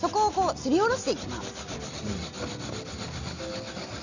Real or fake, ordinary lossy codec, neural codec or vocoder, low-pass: real; none; none; 7.2 kHz